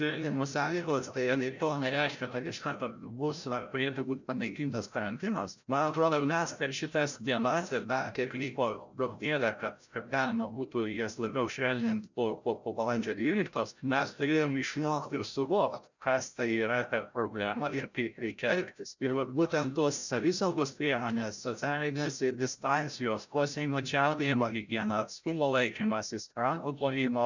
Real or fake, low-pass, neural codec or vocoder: fake; 7.2 kHz; codec, 16 kHz, 0.5 kbps, FreqCodec, larger model